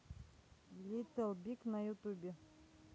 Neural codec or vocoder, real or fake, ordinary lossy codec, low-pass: none; real; none; none